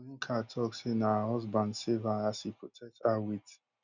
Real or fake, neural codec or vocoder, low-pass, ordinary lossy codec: real; none; 7.2 kHz; none